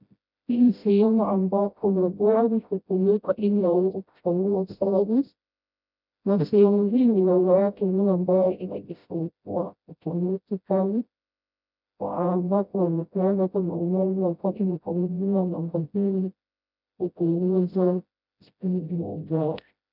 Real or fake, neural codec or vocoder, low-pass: fake; codec, 16 kHz, 0.5 kbps, FreqCodec, smaller model; 5.4 kHz